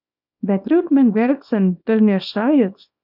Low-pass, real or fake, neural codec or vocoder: 5.4 kHz; fake; codec, 24 kHz, 0.9 kbps, WavTokenizer, small release